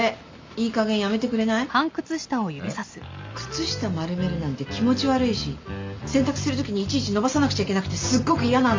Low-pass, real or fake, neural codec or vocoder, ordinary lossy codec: 7.2 kHz; real; none; MP3, 48 kbps